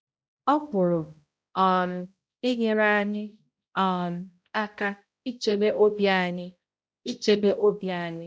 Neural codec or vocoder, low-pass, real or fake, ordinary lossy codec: codec, 16 kHz, 0.5 kbps, X-Codec, HuBERT features, trained on balanced general audio; none; fake; none